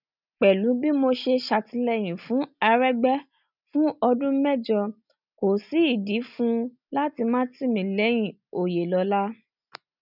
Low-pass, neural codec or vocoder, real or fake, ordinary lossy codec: 5.4 kHz; none; real; none